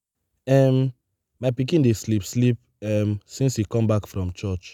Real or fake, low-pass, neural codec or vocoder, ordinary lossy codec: real; 19.8 kHz; none; none